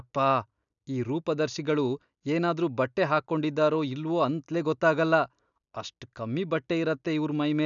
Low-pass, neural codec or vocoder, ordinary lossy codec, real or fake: 7.2 kHz; none; none; real